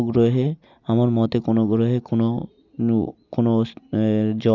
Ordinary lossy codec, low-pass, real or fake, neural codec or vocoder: none; 7.2 kHz; real; none